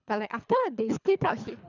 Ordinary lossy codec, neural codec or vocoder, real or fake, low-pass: none; codec, 24 kHz, 3 kbps, HILCodec; fake; 7.2 kHz